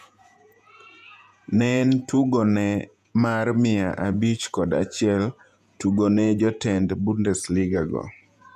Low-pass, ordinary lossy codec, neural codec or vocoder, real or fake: 14.4 kHz; none; vocoder, 48 kHz, 128 mel bands, Vocos; fake